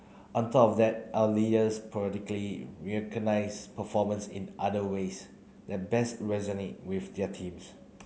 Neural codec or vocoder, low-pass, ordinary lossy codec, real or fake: none; none; none; real